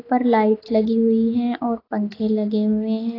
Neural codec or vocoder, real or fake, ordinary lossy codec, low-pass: none; real; AAC, 24 kbps; 5.4 kHz